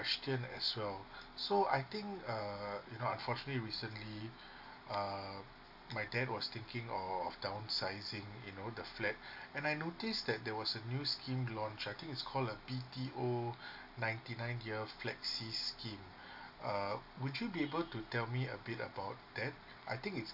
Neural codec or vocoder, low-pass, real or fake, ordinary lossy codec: none; 5.4 kHz; real; AAC, 48 kbps